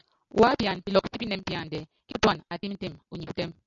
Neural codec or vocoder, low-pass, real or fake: none; 7.2 kHz; real